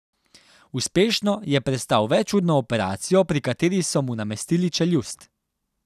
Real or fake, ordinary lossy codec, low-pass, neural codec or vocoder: real; none; 14.4 kHz; none